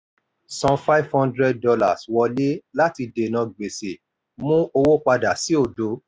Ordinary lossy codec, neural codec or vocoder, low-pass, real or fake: none; none; none; real